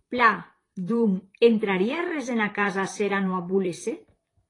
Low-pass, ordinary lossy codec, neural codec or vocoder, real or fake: 10.8 kHz; AAC, 32 kbps; vocoder, 44.1 kHz, 128 mel bands, Pupu-Vocoder; fake